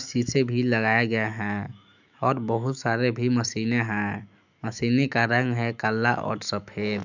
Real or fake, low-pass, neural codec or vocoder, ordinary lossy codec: fake; 7.2 kHz; autoencoder, 48 kHz, 128 numbers a frame, DAC-VAE, trained on Japanese speech; none